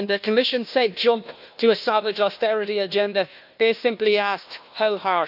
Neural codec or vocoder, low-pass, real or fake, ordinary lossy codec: codec, 16 kHz, 1 kbps, FunCodec, trained on Chinese and English, 50 frames a second; 5.4 kHz; fake; none